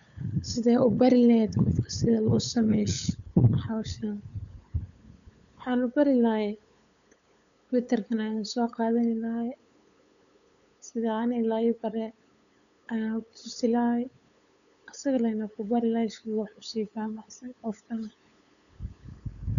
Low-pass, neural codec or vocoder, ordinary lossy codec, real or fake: 7.2 kHz; codec, 16 kHz, 8 kbps, FunCodec, trained on LibriTTS, 25 frames a second; none; fake